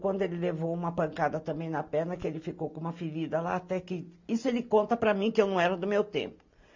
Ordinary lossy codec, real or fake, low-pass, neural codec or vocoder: MP3, 48 kbps; real; 7.2 kHz; none